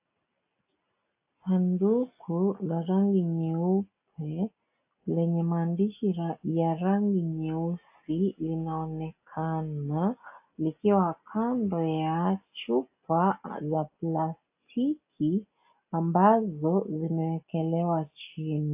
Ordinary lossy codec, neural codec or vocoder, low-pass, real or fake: AAC, 32 kbps; none; 3.6 kHz; real